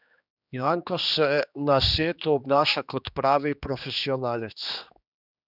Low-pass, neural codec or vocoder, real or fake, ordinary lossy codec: 5.4 kHz; codec, 16 kHz, 2 kbps, X-Codec, HuBERT features, trained on general audio; fake; none